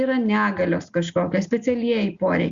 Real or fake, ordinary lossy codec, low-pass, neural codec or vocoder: real; Opus, 64 kbps; 7.2 kHz; none